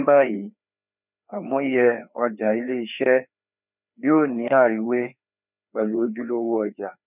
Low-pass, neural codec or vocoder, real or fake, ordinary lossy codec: 3.6 kHz; codec, 16 kHz, 2 kbps, FreqCodec, larger model; fake; none